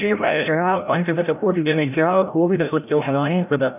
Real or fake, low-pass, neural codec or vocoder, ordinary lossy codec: fake; 3.6 kHz; codec, 16 kHz, 0.5 kbps, FreqCodec, larger model; none